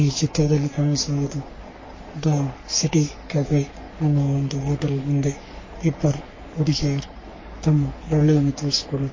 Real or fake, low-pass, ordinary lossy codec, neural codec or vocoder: fake; 7.2 kHz; MP3, 32 kbps; codec, 44.1 kHz, 3.4 kbps, Pupu-Codec